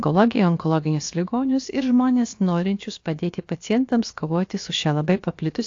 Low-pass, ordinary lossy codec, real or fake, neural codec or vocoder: 7.2 kHz; AAC, 48 kbps; fake; codec, 16 kHz, about 1 kbps, DyCAST, with the encoder's durations